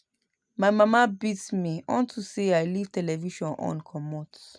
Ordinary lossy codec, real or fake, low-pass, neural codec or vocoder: none; real; 9.9 kHz; none